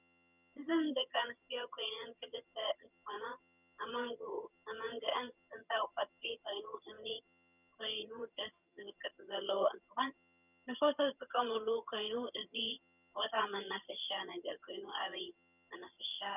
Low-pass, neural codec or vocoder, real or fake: 3.6 kHz; vocoder, 22.05 kHz, 80 mel bands, HiFi-GAN; fake